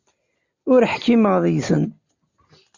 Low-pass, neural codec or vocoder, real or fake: 7.2 kHz; none; real